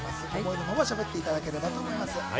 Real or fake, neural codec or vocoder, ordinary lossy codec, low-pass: real; none; none; none